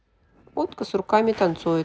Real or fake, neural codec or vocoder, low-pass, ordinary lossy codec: real; none; none; none